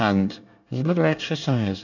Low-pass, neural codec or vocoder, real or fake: 7.2 kHz; codec, 24 kHz, 1 kbps, SNAC; fake